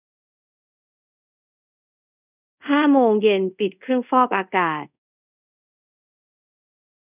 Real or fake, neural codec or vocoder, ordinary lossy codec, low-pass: fake; codec, 24 kHz, 0.5 kbps, DualCodec; none; 3.6 kHz